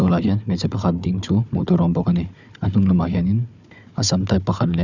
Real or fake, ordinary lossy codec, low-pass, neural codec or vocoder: fake; none; 7.2 kHz; codec, 16 kHz, 4 kbps, FunCodec, trained on Chinese and English, 50 frames a second